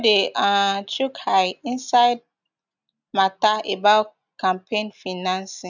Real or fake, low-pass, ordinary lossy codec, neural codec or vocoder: real; 7.2 kHz; none; none